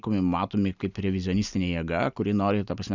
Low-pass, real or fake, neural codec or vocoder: 7.2 kHz; real; none